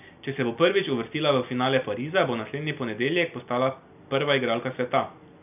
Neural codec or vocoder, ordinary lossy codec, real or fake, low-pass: none; none; real; 3.6 kHz